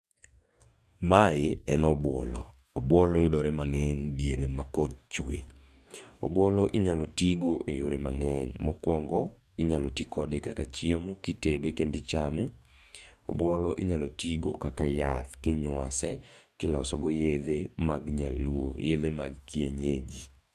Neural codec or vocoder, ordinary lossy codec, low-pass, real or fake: codec, 44.1 kHz, 2.6 kbps, DAC; none; 14.4 kHz; fake